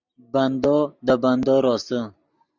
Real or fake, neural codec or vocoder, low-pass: real; none; 7.2 kHz